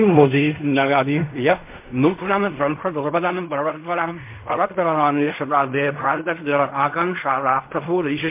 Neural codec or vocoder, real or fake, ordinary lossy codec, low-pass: codec, 16 kHz in and 24 kHz out, 0.4 kbps, LongCat-Audio-Codec, fine tuned four codebook decoder; fake; MP3, 32 kbps; 3.6 kHz